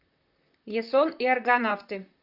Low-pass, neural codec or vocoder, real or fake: 5.4 kHz; vocoder, 44.1 kHz, 128 mel bands, Pupu-Vocoder; fake